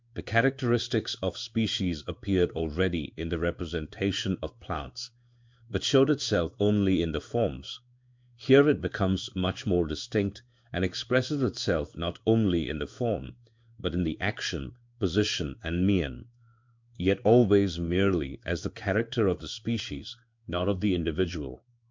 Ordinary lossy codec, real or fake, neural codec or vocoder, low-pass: MP3, 64 kbps; fake; codec, 16 kHz in and 24 kHz out, 1 kbps, XY-Tokenizer; 7.2 kHz